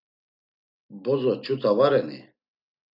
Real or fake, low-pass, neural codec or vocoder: real; 5.4 kHz; none